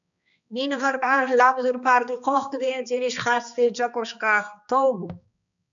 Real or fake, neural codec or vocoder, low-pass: fake; codec, 16 kHz, 2 kbps, X-Codec, HuBERT features, trained on balanced general audio; 7.2 kHz